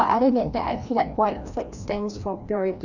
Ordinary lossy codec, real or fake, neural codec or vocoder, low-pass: none; fake; codec, 16 kHz, 1 kbps, FreqCodec, larger model; 7.2 kHz